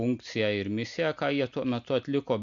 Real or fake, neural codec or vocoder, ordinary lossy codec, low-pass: real; none; MP3, 64 kbps; 7.2 kHz